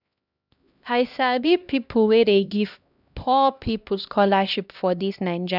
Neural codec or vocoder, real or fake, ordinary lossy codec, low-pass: codec, 16 kHz, 1 kbps, X-Codec, HuBERT features, trained on LibriSpeech; fake; none; 5.4 kHz